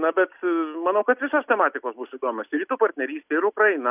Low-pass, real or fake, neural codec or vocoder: 3.6 kHz; real; none